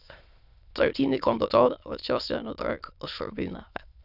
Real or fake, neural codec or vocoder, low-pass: fake; autoencoder, 22.05 kHz, a latent of 192 numbers a frame, VITS, trained on many speakers; 5.4 kHz